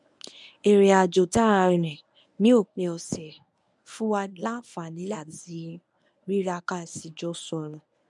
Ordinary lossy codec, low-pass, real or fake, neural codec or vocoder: none; 10.8 kHz; fake; codec, 24 kHz, 0.9 kbps, WavTokenizer, medium speech release version 1